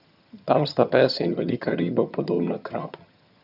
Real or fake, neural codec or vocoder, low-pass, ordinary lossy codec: fake; vocoder, 22.05 kHz, 80 mel bands, HiFi-GAN; 5.4 kHz; none